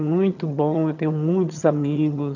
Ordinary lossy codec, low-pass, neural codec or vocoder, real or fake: none; 7.2 kHz; vocoder, 22.05 kHz, 80 mel bands, HiFi-GAN; fake